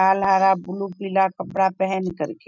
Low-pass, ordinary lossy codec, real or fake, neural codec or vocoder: 7.2 kHz; none; real; none